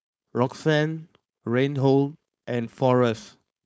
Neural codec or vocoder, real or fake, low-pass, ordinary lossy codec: codec, 16 kHz, 4.8 kbps, FACodec; fake; none; none